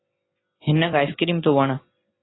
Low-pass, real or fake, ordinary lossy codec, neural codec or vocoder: 7.2 kHz; real; AAC, 16 kbps; none